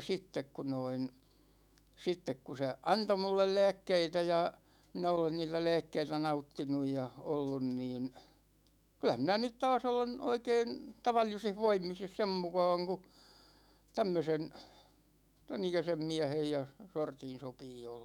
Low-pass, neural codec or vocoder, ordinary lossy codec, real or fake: 19.8 kHz; autoencoder, 48 kHz, 128 numbers a frame, DAC-VAE, trained on Japanese speech; none; fake